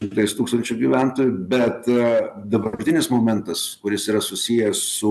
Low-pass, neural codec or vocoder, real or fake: 14.4 kHz; none; real